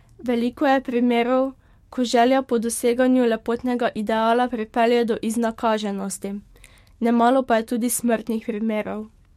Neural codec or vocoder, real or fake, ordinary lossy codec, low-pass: codec, 44.1 kHz, 7.8 kbps, DAC; fake; MP3, 64 kbps; 19.8 kHz